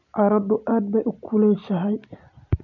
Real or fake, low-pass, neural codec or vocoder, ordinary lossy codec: real; 7.2 kHz; none; none